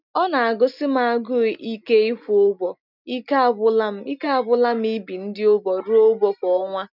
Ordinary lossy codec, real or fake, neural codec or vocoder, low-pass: none; real; none; 5.4 kHz